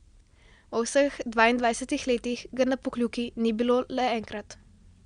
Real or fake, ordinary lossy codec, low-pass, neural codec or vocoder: real; Opus, 64 kbps; 9.9 kHz; none